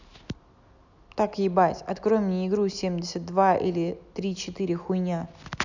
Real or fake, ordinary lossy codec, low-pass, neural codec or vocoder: real; none; 7.2 kHz; none